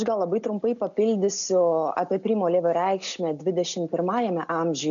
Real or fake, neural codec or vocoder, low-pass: real; none; 7.2 kHz